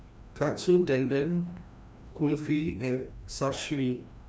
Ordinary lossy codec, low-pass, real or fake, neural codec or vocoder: none; none; fake; codec, 16 kHz, 1 kbps, FreqCodec, larger model